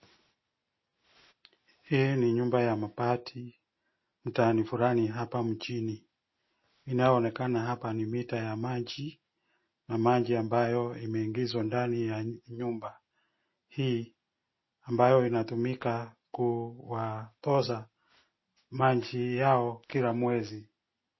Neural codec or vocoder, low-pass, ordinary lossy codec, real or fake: none; 7.2 kHz; MP3, 24 kbps; real